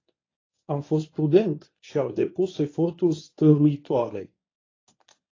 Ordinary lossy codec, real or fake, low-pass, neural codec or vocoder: AAC, 32 kbps; fake; 7.2 kHz; codec, 24 kHz, 0.9 kbps, WavTokenizer, medium speech release version 1